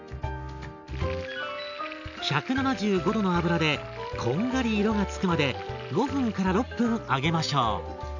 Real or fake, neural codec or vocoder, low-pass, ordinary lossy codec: real; none; 7.2 kHz; none